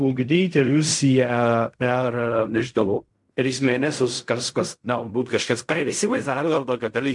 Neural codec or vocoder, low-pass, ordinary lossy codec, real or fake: codec, 16 kHz in and 24 kHz out, 0.4 kbps, LongCat-Audio-Codec, fine tuned four codebook decoder; 10.8 kHz; MP3, 64 kbps; fake